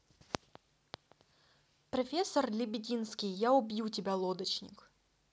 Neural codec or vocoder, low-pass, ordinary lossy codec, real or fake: none; none; none; real